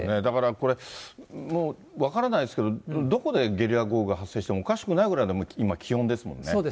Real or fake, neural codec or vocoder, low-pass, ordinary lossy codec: real; none; none; none